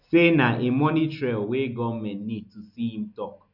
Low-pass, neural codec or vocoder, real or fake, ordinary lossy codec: 5.4 kHz; none; real; none